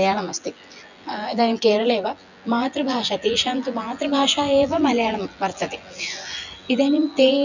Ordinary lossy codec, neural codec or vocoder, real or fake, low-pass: none; vocoder, 24 kHz, 100 mel bands, Vocos; fake; 7.2 kHz